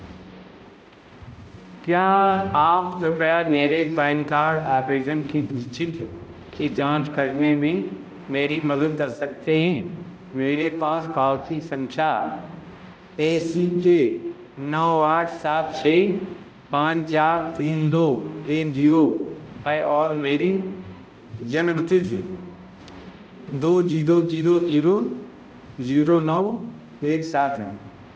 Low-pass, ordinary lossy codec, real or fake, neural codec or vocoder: none; none; fake; codec, 16 kHz, 0.5 kbps, X-Codec, HuBERT features, trained on balanced general audio